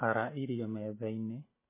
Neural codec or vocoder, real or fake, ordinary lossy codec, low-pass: none; real; MP3, 16 kbps; 3.6 kHz